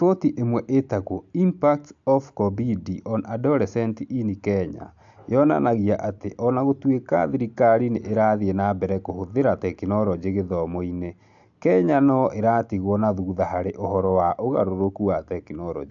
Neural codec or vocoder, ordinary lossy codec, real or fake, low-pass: none; AAC, 64 kbps; real; 7.2 kHz